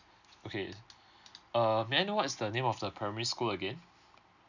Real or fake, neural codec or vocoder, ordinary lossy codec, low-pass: real; none; none; 7.2 kHz